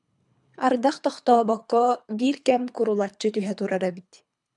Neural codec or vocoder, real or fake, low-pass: codec, 24 kHz, 3 kbps, HILCodec; fake; 10.8 kHz